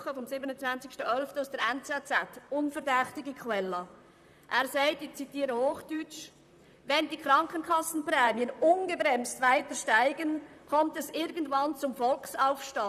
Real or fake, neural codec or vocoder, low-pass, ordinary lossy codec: fake; vocoder, 44.1 kHz, 128 mel bands, Pupu-Vocoder; 14.4 kHz; none